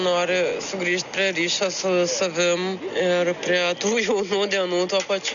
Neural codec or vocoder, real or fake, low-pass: none; real; 7.2 kHz